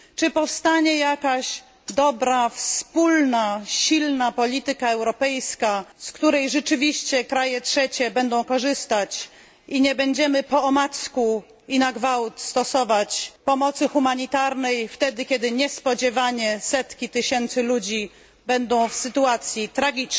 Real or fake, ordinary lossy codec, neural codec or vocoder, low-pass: real; none; none; none